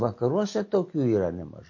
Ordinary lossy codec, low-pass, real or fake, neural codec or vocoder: MP3, 32 kbps; 7.2 kHz; real; none